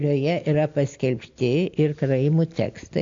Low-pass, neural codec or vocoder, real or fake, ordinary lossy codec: 7.2 kHz; none; real; AAC, 48 kbps